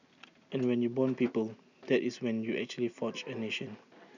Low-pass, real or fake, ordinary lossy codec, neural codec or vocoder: 7.2 kHz; real; none; none